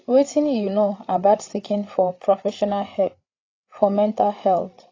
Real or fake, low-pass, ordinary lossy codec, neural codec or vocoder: fake; 7.2 kHz; AAC, 32 kbps; codec, 16 kHz, 8 kbps, FreqCodec, larger model